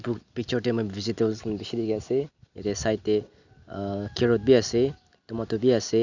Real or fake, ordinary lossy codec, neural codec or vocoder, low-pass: real; none; none; 7.2 kHz